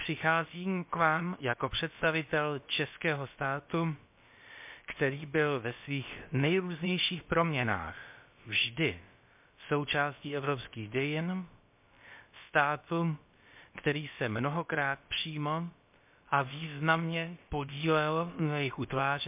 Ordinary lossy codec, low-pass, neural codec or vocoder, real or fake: MP3, 32 kbps; 3.6 kHz; codec, 16 kHz, about 1 kbps, DyCAST, with the encoder's durations; fake